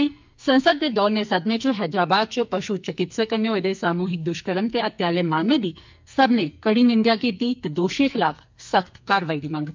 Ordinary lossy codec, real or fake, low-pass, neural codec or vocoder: MP3, 64 kbps; fake; 7.2 kHz; codec, 44.1 kHz, 2.6 kbps, SNAC